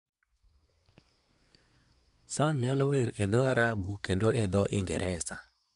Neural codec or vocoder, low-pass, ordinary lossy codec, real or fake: codec, 24 kHz, 1 kbps, SNAC; 10.8 kHz; none; fake